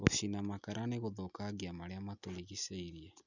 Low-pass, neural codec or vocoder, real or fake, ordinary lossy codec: 7.2 kHz; none; real; none